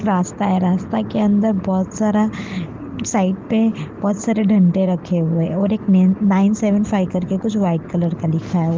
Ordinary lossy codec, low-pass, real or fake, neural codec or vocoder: Opus, 16 kbps; 7.2 kHz; real; none